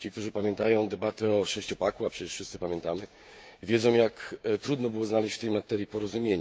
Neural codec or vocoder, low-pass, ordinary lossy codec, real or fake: codec, 16 kHz, 6 kbps, DAC; none; none; fake